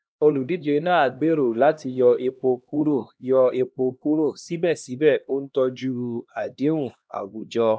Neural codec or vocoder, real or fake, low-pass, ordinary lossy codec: codec, 16 kHz, 1 kbps, X-Codec, HuBERT features, trained on LibriSpeech; fake; none; none